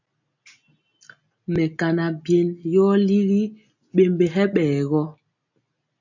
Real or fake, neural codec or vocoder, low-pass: real; none; 7.2 kHz